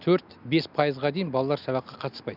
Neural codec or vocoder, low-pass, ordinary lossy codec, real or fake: none; 5.4 kHz; none; real